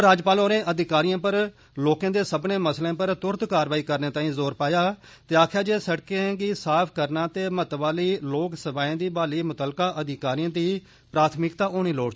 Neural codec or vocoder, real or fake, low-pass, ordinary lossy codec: none; real; none; none